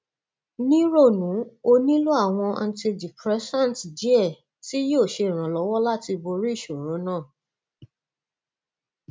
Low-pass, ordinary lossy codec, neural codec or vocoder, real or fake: none; none; none; real